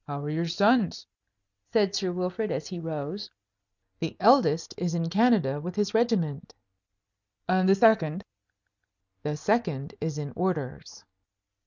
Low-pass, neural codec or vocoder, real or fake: 7.2 kHz; none; real